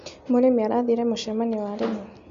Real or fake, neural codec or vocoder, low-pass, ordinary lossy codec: real; none; 7.2 kHz; MP3, 48 kbps